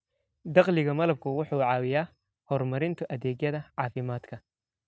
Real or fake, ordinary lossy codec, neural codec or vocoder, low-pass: real; none; none; none